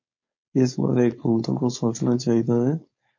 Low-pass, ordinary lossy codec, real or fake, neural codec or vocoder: 7.2 kHz; MP3, 32 kbps; fake; codec, 16 kHz, 4.8 kbps, FACodec